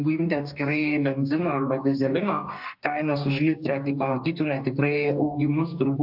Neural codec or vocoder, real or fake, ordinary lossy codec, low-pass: codec, 44.1 kHz, 2.6 kbps, DAC; fake; AAC, 48 kbps; 5.4 kHz